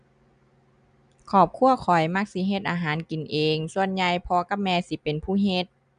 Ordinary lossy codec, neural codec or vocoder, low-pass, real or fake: none; none; 9.9 kHz; real